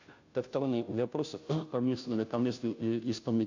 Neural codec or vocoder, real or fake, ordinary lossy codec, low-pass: codec, 16 kHz, 0.5 kbps, FunCodec, trained on Chinese and English, 25 frames a second; fake; AAC, 48 kbps; 7.2 kHz